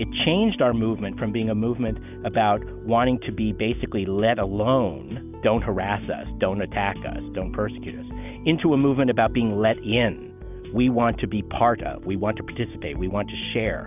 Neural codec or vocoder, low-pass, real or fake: none; 3.6 kHz; real